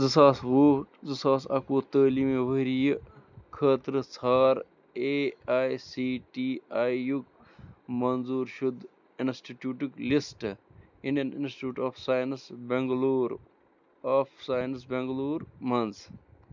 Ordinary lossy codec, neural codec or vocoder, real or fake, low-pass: none; none; real; 7.2 kHz